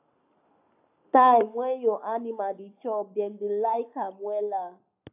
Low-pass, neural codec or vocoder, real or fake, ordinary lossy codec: 3.6 kHz; codec, 44.1 kHz, 7.8 kbps, Pupu-Codec; fake; AAC, 32 kbps